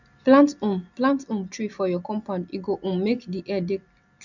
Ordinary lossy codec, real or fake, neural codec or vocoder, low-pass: none; real; none; 7.2 kHz